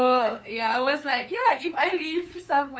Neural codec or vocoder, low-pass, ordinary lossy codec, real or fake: codec, 16 kHz, 2 kbps, FunCodec, trained on LibriTTS, 25 frames a second; none; none; fake